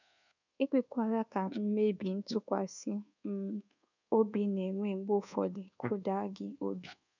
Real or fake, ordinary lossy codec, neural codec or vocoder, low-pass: fake; none; codec, 24 kHz, 1.2 kbps, DualCodec; 7.2 kHz